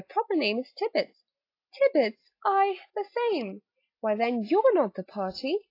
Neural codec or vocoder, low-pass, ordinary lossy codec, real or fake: none; 5.4 kHz; AAC, 32 kbps; real